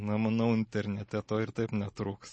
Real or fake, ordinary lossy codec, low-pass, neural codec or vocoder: real; MP3, 32 kbps; 9.9 kHz; none